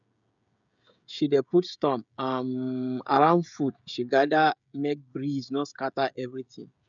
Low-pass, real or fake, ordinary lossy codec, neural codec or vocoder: 7.2 kHz; fake; none; codec, 16 kHz, 16 kbps, FreqCodec, smaller model